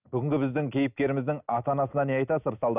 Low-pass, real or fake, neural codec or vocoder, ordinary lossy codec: 3.6 kHz; real; none; none